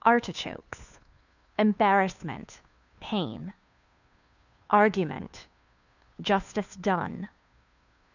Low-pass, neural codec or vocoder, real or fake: 7.2 kHz; codec, 16 kHz, 2 kbps, FunCodec, trained on Chinese and English, 25 frames a second; fake